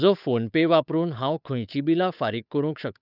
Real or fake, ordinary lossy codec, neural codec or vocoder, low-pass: fake; none; codec, 16 kHz, 8 kbps, FunCodec, trained on Chinese and English, 25 frames a second; 5.4 kHz